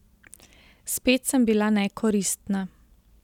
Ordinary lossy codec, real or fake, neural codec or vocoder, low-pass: none; real; none; 19.8 kHz